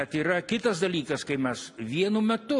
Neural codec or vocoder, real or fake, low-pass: none; real; 10.8 kHz